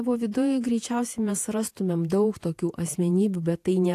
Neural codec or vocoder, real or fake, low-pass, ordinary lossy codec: vocoder, 48 kHz, 128 mel bands, Vocos; fake; 14.4 kHz; AAC, 64 kbps